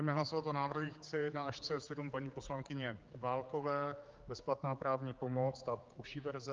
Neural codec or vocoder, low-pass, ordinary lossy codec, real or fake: codec, 16 kHz, 4 kbps, X-Codec, HuBERT features, trained on general audio; 7.2 kHz; Opus, 24 kbps; fake